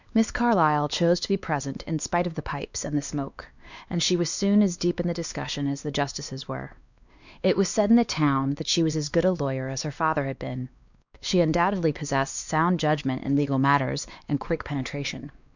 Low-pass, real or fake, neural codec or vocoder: 7.2 kHz; fake; codec, 16 kHz, 2 kbps, X-Codec, WavLM features, trained on Multilingual LibriSpeech